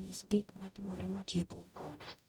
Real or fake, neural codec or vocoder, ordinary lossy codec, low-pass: fake; codec, 44.1 kHz, 0.9 kbps, DAC; none; none